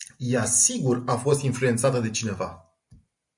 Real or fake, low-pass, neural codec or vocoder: real; 10.8 kHz; none